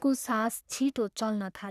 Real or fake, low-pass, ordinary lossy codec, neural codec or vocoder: fake; 14.4 kHz; none; codec, 44.1 kHz, 3.4 kbps, Pupu-Codec